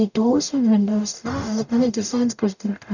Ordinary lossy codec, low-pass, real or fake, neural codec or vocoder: none; 7.2 kHz; fake; codec, 44.1 kHz, 0.9 kbps, DAC